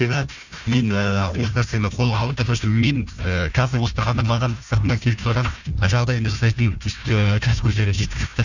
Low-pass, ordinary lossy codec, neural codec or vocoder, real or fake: 7.2 kHz; none; codec, 16 kHz, 1 kbps, FunCodec, trained on Chinese and English, 50 frames a second; fake